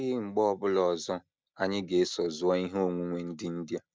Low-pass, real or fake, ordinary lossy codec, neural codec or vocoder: none; real; none; none